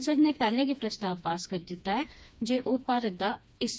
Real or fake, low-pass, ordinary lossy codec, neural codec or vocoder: fake; none; none; codec, 16 kHz, 2 kbps, FreqCodec, smaller model